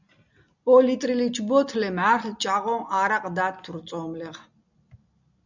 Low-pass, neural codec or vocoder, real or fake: 7.2 kHz; none; real